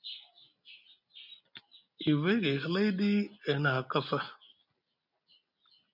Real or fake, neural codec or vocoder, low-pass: real; none; 5.4 kHz